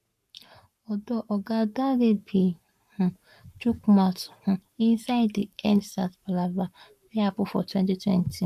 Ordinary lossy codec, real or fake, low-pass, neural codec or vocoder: MP3, 96 kbps; fake; 14.4 kHz; codec, 44.1 kHz, 7.8 kbps, Pupu-Codec